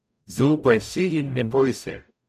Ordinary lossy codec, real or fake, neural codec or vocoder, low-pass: none; fake; codec, 44.1 kHz, 0.9 kbps, DAC; 14.4 kHz